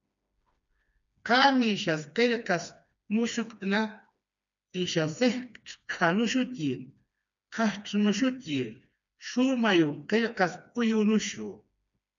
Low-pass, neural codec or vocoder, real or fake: 7.2 kHz; codec, 16 kHz, 2 kbps, FreqCodec, smaller model; fake